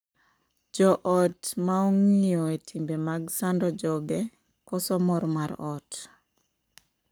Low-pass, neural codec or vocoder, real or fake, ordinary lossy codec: none; codec, 44.1 kHz, 7.8 kbps, Pupu-Codec; fake; none